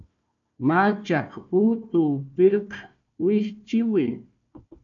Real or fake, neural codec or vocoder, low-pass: fake; codec, 16 kHz, 1 kbps, FunCodec, trained on Chinese and English, 50 frames a second; 7.2 kHz